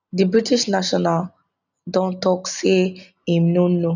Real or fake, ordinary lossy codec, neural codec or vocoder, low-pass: real; none; none; 7.2 kHz